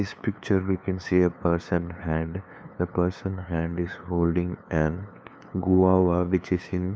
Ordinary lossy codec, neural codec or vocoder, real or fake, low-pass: none; codec, 16 kHz, 2 kbps, FunCodec, trained on LibriTTS, 25 frames a second; fake; none